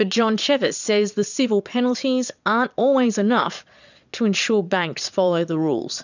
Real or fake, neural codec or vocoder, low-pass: fake; vocoder, 22.05 kHz, 80 mel bands, Vocos; 7.2 kHz